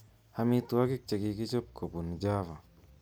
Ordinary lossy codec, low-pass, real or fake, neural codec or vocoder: none; none; real; none